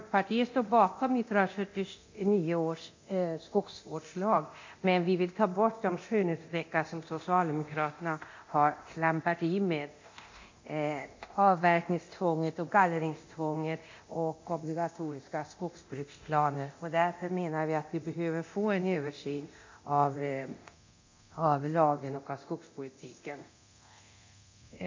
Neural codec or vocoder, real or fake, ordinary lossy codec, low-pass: codec, 24 kHz, 0.9 kbps, DualCodec; fake; MP3, 48 kbps; 7.2 kHz